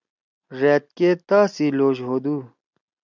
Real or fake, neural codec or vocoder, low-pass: real; none; 7.2 kHz